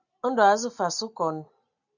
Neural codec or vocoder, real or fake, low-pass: none; real; 7.2 kHz